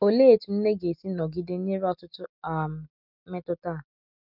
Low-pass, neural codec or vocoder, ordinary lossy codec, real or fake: 5.4 kHz; none; none; real